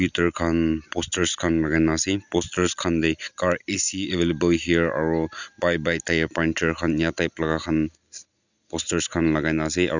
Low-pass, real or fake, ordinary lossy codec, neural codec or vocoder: 7.2 kHz; real; none; none